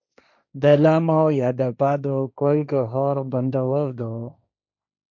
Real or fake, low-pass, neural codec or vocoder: fake; 7.2 kHz; codec, 16 kHz, 1.1 kbps, Voila-Tokenizer